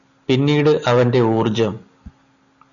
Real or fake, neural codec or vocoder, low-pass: real; none; 7.2 kHz